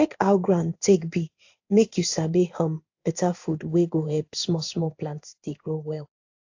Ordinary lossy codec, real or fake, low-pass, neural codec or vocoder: AAC, 48 kbps; fake; 7.2 kHz; codec, 16 kHz in and 24 kHz out, 1 kbps, XY-Tokenizer